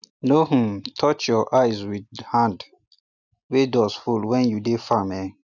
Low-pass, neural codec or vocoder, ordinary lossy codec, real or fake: 7.2 kHz; none; none; real